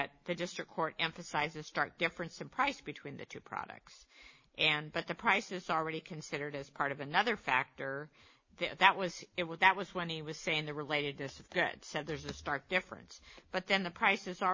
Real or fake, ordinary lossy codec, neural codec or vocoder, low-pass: real; MP3, 32 kbps; none; 7.2 kHz